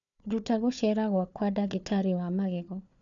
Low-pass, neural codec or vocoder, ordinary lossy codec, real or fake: 7.2 kHz; codec, 16 kHz, 4 kbps, FunCodec, trained on Chinese and English, 50 frames a second; none; fake